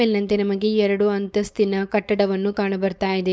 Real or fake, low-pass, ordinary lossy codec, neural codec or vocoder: fake; none; none; codec, 16 kHz, 4.8 kbps, FACodec